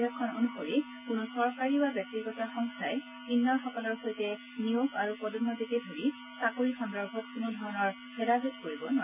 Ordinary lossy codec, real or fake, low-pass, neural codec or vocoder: none; real; 3.6 kHz; none